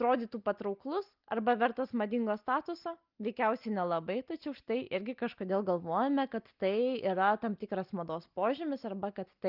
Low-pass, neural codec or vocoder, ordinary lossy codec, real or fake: 5.4 kHz; none; Opus, 24 kbps; real